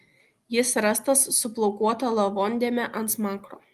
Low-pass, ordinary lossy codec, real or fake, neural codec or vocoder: 14.4 kHz; Opus, 24 kbps; real; none